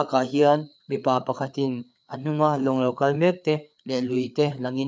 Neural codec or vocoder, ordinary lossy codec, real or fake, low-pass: codec, 16 kHz, 4 kbps, FreqCodec, larger model; none; fake; none